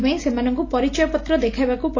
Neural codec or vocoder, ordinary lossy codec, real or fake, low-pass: none; AAC, 32 kbps; real; 7.2 kHz